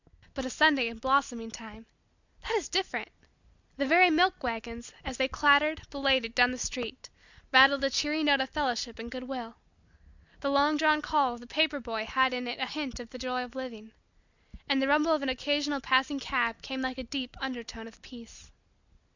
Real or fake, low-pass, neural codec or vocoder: real; 7.2 kHz; none